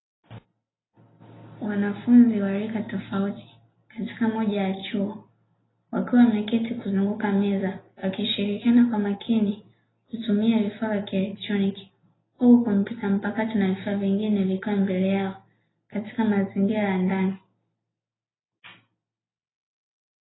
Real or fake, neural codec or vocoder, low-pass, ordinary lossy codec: real; none; 7.2 kHz; AAC, 16 kbps